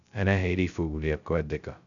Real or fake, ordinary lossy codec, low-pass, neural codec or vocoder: fake; none; 7.2 kHz; codec, 16 kHz, 0.2 kbps, FocalCodec